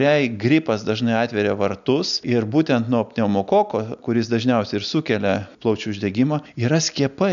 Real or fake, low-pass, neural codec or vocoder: real; 7.2 kHz; none